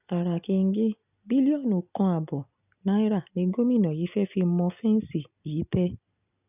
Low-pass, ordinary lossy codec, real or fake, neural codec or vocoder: 3.6 kHz; none; real; none